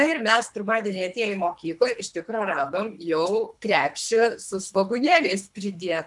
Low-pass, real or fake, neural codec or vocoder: 10.8 kHz; fake; codec, 24 kHz, 3 kbps, HILCodec